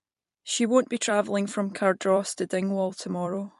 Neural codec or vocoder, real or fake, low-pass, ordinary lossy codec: vocoder, 44.1 kHz, 128 mel bands every 256 samples, BigVGAN v2; fake; 14.4 kHz; MP3, 48 kbps